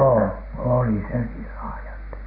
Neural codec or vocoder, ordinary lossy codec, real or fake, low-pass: none; MP3, 32 kbps; real; 5.4 kHz